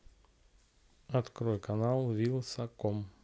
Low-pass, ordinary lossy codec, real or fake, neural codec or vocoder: none; none; real; none